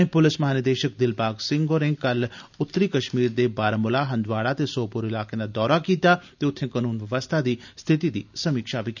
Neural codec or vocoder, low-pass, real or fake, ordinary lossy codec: none; 7.2 kHz; real; none